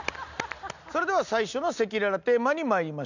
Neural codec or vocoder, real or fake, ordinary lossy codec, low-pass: none; real; none; 7.2 kHz